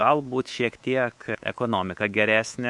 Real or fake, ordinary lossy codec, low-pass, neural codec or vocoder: fake; MP3, 64 kbps; 10.8 kHz; codec, 24 kHz, 3.1 kbps, DualCodec